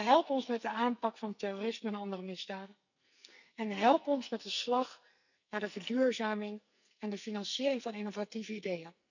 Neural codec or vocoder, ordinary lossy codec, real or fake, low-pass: codec, 44.1 kHz, 2.6 kbps, SNAC; none; fake; 7.2 kHz